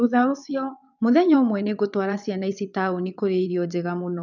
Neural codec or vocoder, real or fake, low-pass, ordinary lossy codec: vocoder, 22.05 kHz, 80 mel bands, WaveNeXt; fake; 7.2 kHz; none